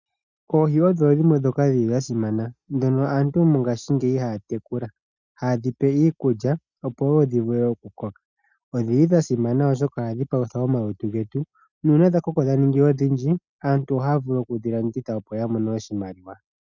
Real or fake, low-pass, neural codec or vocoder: real; 7.2 kHz; none